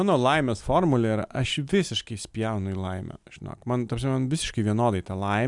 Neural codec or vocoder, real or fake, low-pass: none; real; 10.8 kHz